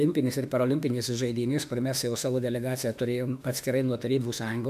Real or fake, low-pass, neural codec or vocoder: fake; 14.4 kHz; autoencoder, 48 kHz, 32 numbers a frame, DAC-VAE, trained on Japanese speech